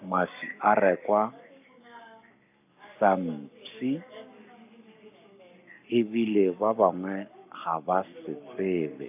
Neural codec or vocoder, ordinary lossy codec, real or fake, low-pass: codec, 44.1 kHz, 7.8 kbps, Pupu-Codec; AAC, 32 kbps; fake; 3.6 kHz